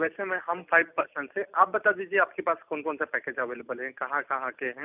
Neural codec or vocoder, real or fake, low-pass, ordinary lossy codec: none; real; 3.6 kHz; none